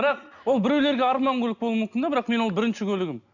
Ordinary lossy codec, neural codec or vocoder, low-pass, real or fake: none; none; 7.2 kHz; real